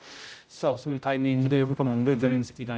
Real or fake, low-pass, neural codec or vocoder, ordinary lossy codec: fake; none; codec, 16 kHz, 0.5 kbps, X-Codec, HuBERT features, trained on general audio; none